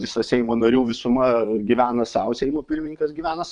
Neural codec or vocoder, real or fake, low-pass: vocoder, 22.05 kHz, 80 mel bands, WaveNeXt; fake; 9.9 kHz